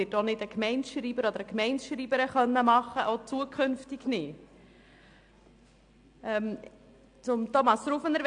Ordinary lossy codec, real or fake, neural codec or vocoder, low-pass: AAC, 64 kbps; real; none; 9.9 kHz